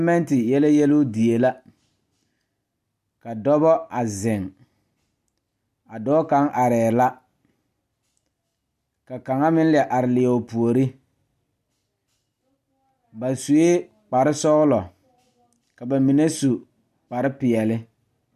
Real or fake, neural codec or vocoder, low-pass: real; none; 14.4 kHz